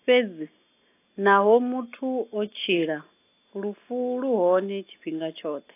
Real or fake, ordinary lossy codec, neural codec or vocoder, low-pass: real; AAC, 24 kbps; none; 3.6 kHz